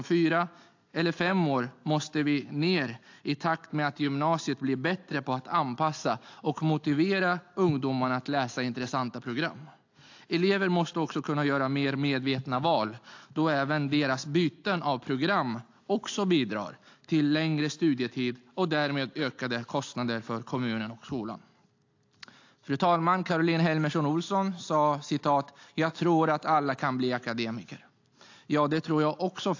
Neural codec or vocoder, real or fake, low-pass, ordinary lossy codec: none; real; 7.2 kHz; AAC, 48 kbps